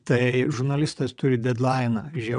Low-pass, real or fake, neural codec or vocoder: 9.9 kHz; fake; vocoder, 22.05 kHz, 80 mel bands, WaveNeXt